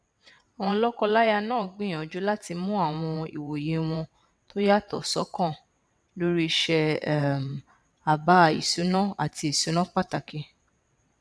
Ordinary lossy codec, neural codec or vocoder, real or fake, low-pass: none; vocoder, 22.05 kHz, 80 mel bands, WaveNeXt; fake; none